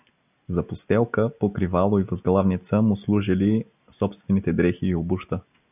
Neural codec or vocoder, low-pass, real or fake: none; 3.6 kHz; real